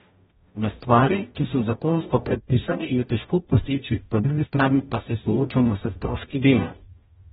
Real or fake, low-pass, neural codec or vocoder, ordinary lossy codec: fake; 19.8 kHz; codec, 44.1 kHz, 0.9 kbps, DAC; AAC, 16 kbps